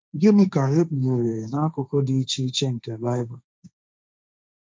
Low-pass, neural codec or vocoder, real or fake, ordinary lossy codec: none; codec, 16 kHz, 1.1 kbps, Voila-Tokenizer; fake; none